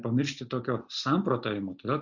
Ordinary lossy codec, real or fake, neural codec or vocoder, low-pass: Opus, 64 kbps; real; none; 7.2 kHz